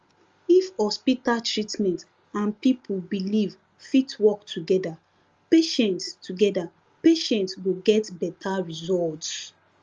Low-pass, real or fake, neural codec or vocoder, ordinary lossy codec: 7.2 kHz; real; none; Opus, 32 kbps